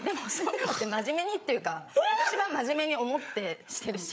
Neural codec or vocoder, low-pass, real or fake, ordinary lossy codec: codec, 16 kHz, 8 kbps, FreqCodec, larger model; none; fake; none